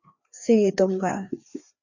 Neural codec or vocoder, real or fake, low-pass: codec, 16 kHz, 2 kbps, FreqCodec, larger model; fake; 7.2 kHz